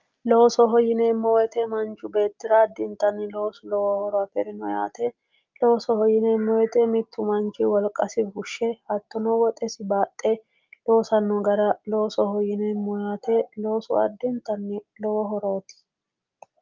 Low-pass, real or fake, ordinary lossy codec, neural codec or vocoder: 7.2 kHz; real; Opus, 32 kbps; none